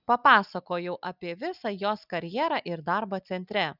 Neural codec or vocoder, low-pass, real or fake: none; 5.4 kHz; real